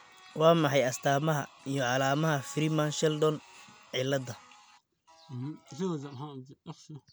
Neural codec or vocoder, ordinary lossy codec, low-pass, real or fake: none; none; none; real